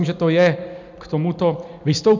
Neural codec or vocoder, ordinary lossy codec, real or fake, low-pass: none; MP3, 64 kbps; real; 7.2 kHz